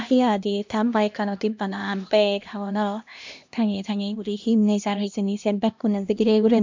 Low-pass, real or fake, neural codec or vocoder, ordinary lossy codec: 7.2 kHz; fake; codec, 16 kHz, 1 kbps, X-Codec, HuBERT features, trained on LibriSpeech; MP3, 64 kbps